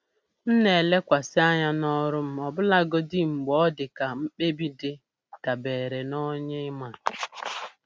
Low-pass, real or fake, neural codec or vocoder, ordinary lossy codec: none; real; none; none